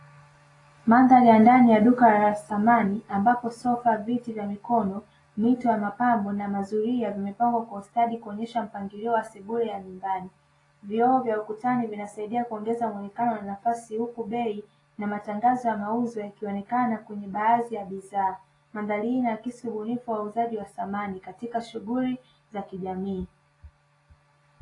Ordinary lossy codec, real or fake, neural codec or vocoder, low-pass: AAC, 32 kbps; real; none; 10.8 kHz